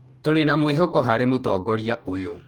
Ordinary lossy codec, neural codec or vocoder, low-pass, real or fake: Opus, 32 kbps; codec, 44.1 kHz, 2.6 kbps, DAC; 19.8 kHz; fake